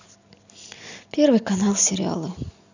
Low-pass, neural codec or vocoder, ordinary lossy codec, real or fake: 7.2 kHz; none; none; real